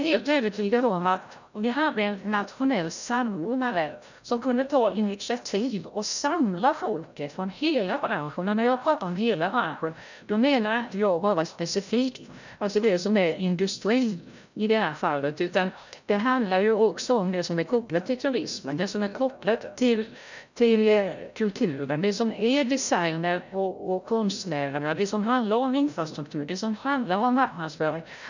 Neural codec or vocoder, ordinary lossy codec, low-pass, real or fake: codec, 16 kHz, 0.5 kbps, FreqCodec, larger model; none; 7.2 kHz; fake